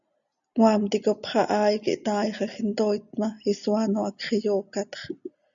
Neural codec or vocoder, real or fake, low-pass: none; real; 7.2 kHz